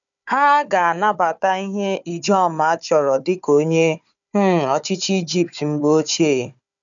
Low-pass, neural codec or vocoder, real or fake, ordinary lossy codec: 7.2 kHz; codec, 16 kHz, 4 kbps, FunCodec, trained on Chinese and English, 50 frames a second; fake; none